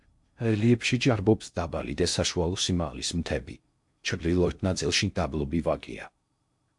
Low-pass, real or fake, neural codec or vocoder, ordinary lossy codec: 10.8 kHz; fake; codec, 16 kHz in and 24 kHz out, 0.6 kbps, FocalCodec, streaming, 2048 codes; MP3, 64 kbps